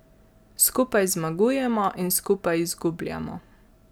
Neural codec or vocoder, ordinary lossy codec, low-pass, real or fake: none; none; none; real